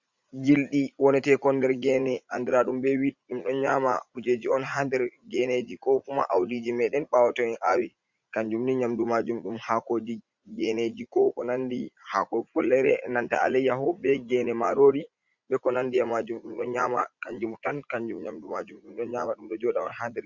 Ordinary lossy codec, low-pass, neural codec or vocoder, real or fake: Opus, 64 kbps; 7.2 kHz; vocoder, 44.1 kHz, 80 mel bands, Vocos; fake